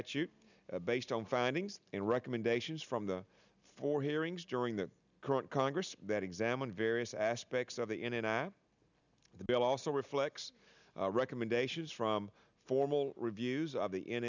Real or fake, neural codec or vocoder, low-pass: real; none; 7.2 kHz